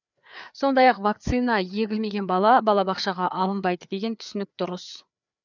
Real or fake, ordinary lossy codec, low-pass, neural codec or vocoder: fake; none; 7.2 kHz; codec, 16 kHz, 4 kbps, FreqCodec, larger model